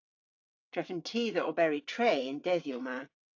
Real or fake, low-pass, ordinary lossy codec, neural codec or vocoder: fake; 7.2 kHz; AAC, 48 kbps; vocoder, 22.05 kHz, 80 mel bands, WaveNeXt